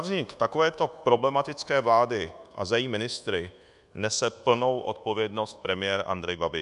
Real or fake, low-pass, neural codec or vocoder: fake; 10.8 kHz; codec, 24 kHz, 1.2 kbps, DualCodec